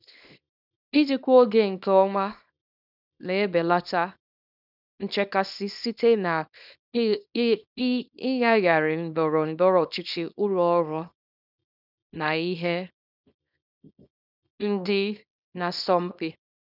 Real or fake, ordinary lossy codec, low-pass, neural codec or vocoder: fake; none; 5.4 kHz; codec, 24 kHz, 0.9 kbps, WavTokenizer, small release